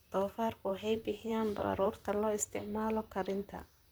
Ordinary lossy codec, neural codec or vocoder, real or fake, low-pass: none; vocoder, 44.1 kHz, 128 mel bands, Pupu-Vocoder; fake; none